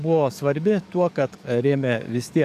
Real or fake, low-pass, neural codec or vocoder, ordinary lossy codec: fake; 14.4 kHz; codec, 44.1 kHz, 7.8 kbps, DAC; AAC, 96 kbps